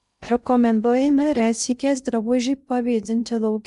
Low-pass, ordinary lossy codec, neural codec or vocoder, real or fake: 10.8 kHz; MP3, 96 kbps; codec, 16 kHz in and 24 kHz out, 0.6 kbps, FocalCodec, streaming, 2048 codes; fake